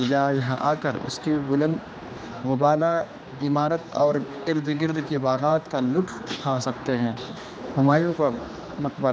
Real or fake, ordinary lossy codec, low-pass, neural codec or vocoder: fake; none; none; codec, 16 kHz, 2 kbps, X-Codec, HuBERT features, trained on general audio